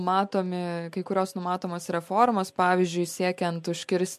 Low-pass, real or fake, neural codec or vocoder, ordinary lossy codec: 14.4 kHz; real; none; MP3, 64 kbps